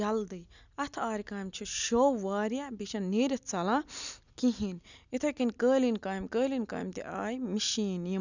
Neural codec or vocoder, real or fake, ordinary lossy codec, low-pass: none; real; none; 7.2 kHz